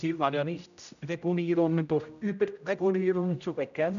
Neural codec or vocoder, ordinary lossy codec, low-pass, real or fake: codec, 16 kHz, 0.5 kbps, X-Codec, HuBERT features, trained on general audio; none; 7.2 kHz; fake